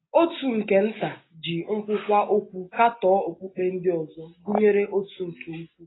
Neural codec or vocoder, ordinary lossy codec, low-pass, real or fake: none; AAC, 16 kbps; 7.2 kHz; real